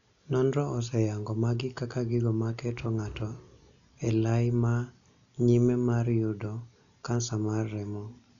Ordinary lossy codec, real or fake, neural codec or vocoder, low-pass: none; real; none; 7.2 kHz